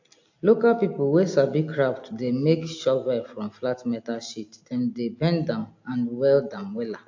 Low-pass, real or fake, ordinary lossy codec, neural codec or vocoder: 7.2 kHz; real; AAC, 48 kbps; none